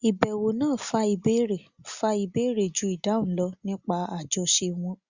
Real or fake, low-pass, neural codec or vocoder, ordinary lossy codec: real; 7.2 kHz; none; Opus, 64 kbps